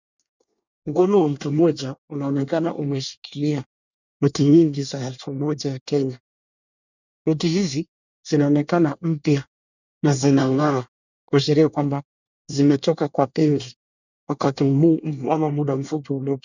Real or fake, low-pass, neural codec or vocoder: fake; 7.2 kHz; codec, 24 kHz, 1 kbps, SNAC